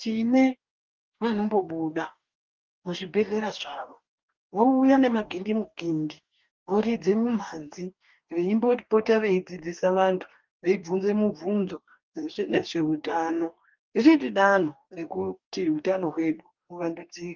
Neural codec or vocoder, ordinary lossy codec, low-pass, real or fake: codec, 44.1 kHz, 2.6 kbps, DAC; Opus, 32 kbps; 7.2 kHz; fake